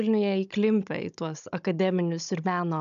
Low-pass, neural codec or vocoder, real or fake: 7.2 kHz; codec, 16 kHz, 16 kbps, FunCodec, trained on Chinese and English, 50 frames a second; fake